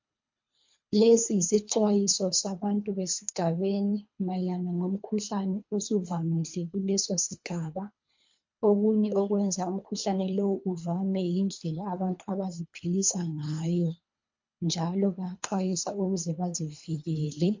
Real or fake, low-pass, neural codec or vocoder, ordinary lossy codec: fake; 7.2 kHz; codec, 24 kHz, 3 kbps, HILCodec; MP3, 48 kbps